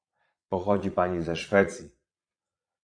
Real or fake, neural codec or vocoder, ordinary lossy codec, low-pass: fake; vocoder, 22.05 kHz, 80 mel bands, Vocos; AAC, 64 kbps; 9.9 kHz